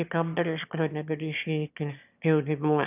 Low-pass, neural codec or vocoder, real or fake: 3.6 kHz; autoencoder, 22.05 kHz, a latent of 192 numbers a frame, VITS, trained on one speaker; fake